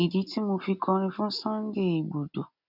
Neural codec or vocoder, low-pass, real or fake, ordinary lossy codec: none; 5.4 kHz; real; none